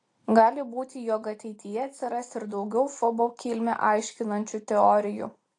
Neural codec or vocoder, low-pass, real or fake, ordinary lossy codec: none; 10.8 kHz; real; AAC, 48 kbps